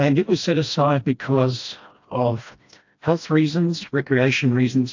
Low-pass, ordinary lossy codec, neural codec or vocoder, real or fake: 7.2 kHz; AAC, 48 kbps; codec, 16 kHz, 1 kbps, FreqCodec, smaller model; fake